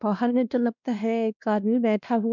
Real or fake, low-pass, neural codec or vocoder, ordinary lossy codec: fake; 7.2 kHz; codec, 16 kHz, 1 kbps, X-Codec, WavLM features, trained on Multilingual LibriSpeech; none